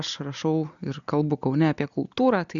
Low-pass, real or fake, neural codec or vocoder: 7.2 kHz; real; none